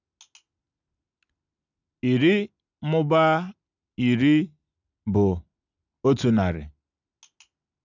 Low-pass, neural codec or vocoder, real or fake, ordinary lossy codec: 7.2 kHz; none; real; none